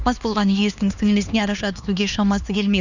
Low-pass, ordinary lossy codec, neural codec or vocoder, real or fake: 7.2 kHz; none; codec, 16 kHz, 2 kbps, X-Codec, HuBERT features, trained on LibriSpeech; fake